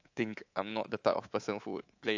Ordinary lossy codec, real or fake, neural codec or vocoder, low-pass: AAC, 48 kbps; fake; codec, 24 kHz, 3.1 kbps, DualCodec; 7.2 kHz